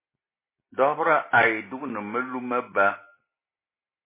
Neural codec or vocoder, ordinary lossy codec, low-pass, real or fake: none; MP3, 16 kbps; 3.6 kHz; real